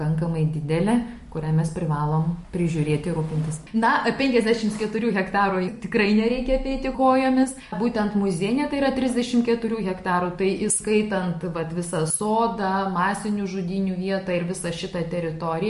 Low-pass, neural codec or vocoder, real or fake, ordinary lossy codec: 14.4 kHz; none; real; MP3, 48 kbps